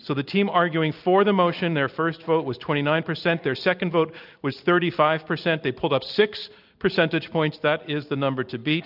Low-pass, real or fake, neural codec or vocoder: 5.4 kHz; real; none